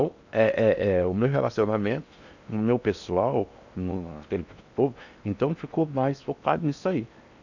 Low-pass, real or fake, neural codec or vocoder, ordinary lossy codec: 7.2 kHz; fake; codec, 16 kHz in and 24 kHz out, 0.8 kbps, FocalCodec, streaming, 65536 codes; none